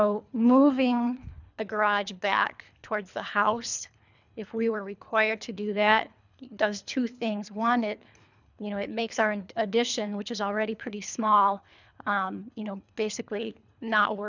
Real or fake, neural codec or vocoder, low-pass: fake; codec, 24 kHz, 3 kbps, HILCodec; 7.2 kHz